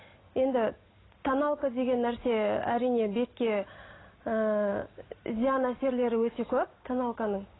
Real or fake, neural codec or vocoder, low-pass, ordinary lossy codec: real; none; 7.2 kHz; AAC, 16 kbps